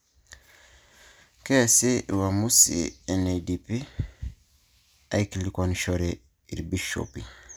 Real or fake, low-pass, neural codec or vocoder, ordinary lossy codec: real; none; none; none